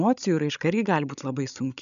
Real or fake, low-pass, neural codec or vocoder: fake; 7.2 kHz; codec, 16 kHz, 16 kbps, FreqCodec, larger model